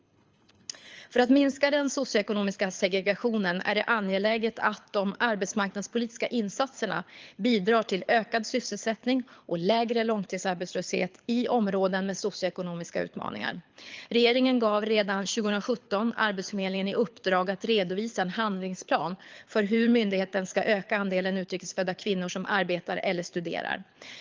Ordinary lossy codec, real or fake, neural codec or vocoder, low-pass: Opus, 24 kbps; fake; codec, 24 kHz, 6 kbps, HILCodec; 7.2 kHz